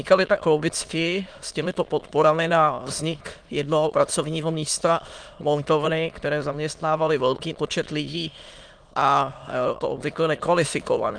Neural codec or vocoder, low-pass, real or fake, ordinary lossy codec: autoencoder, 22.05 kHz, a latent of 192 numbers a frame, VITS, trained on many speakers; 9.9 kHz; fake; Opus, 32 kbps